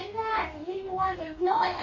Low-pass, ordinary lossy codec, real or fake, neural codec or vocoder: 7.2 kHz; MP3, 64 kbps; fake; codec, 44.1 kHz, 2.6 kbps, DAC